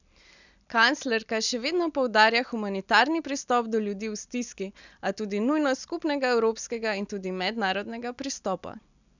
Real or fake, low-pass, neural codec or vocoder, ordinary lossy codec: real; 7.2 kHz; none; none